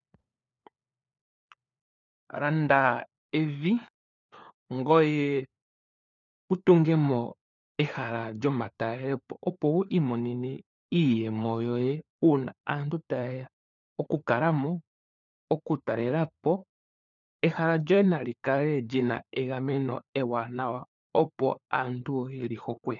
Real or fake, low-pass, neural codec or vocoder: fake; 7.2 kHz; codec, 16 kHz, 4 kbps, FunCodec, trained on LibriTTS, 50 frames a second